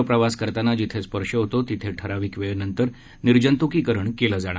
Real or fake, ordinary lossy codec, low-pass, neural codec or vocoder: real; none; none; none